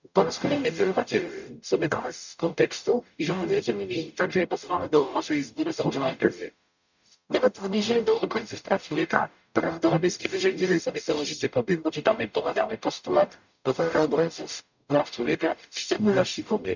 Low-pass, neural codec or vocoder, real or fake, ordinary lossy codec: 7.2 kHz; codec, 44.1 kHz, 0.9 kbps, DAC; fake; none